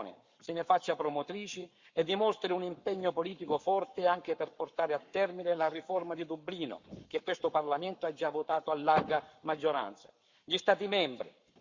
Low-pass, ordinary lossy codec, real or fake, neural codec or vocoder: 7.2 kHz; Opus, 64 kbps; fake; codec, 44.1 kHz, 7.8 kbps, Pupu-Codec